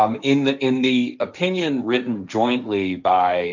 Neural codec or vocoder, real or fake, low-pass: codec, 16 kHz, 1.1 kbps, Voila-Tokenizer; fake; 7.2 kHz